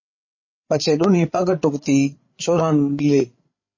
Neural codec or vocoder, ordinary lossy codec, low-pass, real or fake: codec, 16 kHz, 8 kbps, FreqCodec, larger model; MP3, 32 kbps; 7.2 kHz; fake